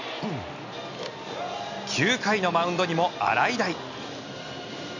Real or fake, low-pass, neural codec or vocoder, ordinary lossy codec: real; 7.2 kHz; none; none